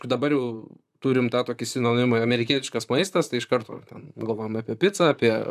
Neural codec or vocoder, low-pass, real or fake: vocoder, 44.1 kHz, 128 mel bands, Pupu-Vocoder; 14.4 kHz; fake